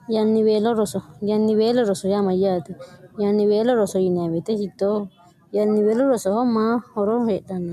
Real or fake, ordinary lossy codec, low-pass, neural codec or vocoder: real; MP3, 96 kbps; 14.4 kHz; none